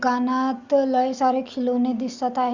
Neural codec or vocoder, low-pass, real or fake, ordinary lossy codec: none; 7.2 kHz; real; Opus, 64 kbps